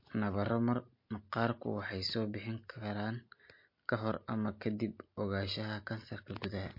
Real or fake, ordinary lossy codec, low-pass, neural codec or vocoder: real; MP3, 32 kbps; 5.4 kHz; none